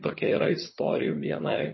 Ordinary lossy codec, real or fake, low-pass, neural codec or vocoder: MP3, 24 kbps; fake; 7.2 kHz; vocoder, 22.05 kHz, 80 mel bands, HiFi-GAN